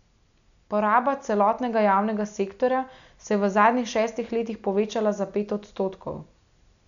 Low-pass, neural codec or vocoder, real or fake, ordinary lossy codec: 7.2 kHz; none; real; none